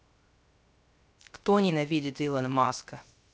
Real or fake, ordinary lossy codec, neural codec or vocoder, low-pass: fake; none; codec, 16 kHz, 0.3 kbps, FocalCodec; none